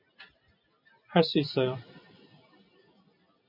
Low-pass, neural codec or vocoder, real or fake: 5.4 kHz; none; real